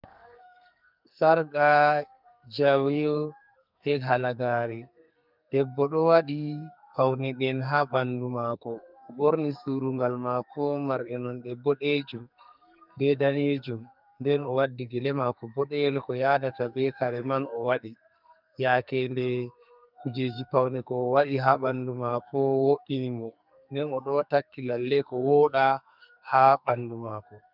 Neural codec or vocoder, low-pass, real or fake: codec, 44.1 kHz, 2.6 kbps, SNAC; 5.4 kHz; fake